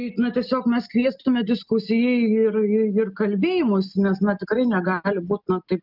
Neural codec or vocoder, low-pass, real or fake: none; 5.4 kHz; real